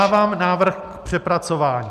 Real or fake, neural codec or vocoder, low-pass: real; none; 14.4 kHz